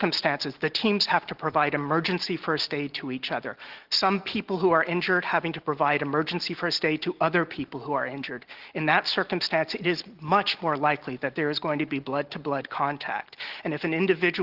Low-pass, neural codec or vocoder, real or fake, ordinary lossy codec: 5.4 kHz; none; real; Opus, 16 kbps